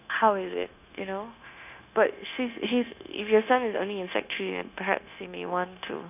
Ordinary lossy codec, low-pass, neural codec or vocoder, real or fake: none; 3.6 kHz; codec, 16 kHz, 0.9 kbps, LongCat-Audio-Codec; fake